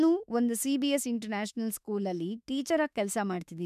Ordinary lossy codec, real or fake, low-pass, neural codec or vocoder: none; fake; 14.4 kHz; autoencoder, 48 kHz, 32 numbers a frame, DAC-VAE, trained on Japanese speech